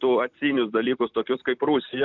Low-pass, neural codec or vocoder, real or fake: 7.2 kHz; vocoder, 44.1 kHz, 128 mel bands every 256 samples, BigVGAN v2; fake